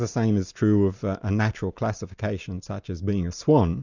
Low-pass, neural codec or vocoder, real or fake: 7.2 kHz; none; real